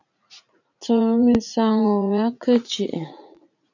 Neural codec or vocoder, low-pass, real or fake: vocoder, 44.1 kHz, 80 mel bands, Vocos; 7.2 kHz; fake